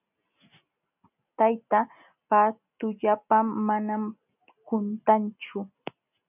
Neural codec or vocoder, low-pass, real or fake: none; 3.6 kHz; real